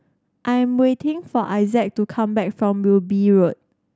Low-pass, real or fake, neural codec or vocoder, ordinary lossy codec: none; real; none; none